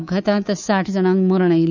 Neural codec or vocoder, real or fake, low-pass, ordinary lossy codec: codec, 24 kHz, 3.1 kbps, DualCodec; fake; 7.2 kHz; none